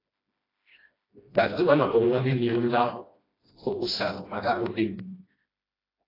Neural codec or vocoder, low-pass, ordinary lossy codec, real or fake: codec, 16 kHz, 1 kbps, FreqCodec, smaller model; 5.4 kHz; AAC, 24 kbps; fake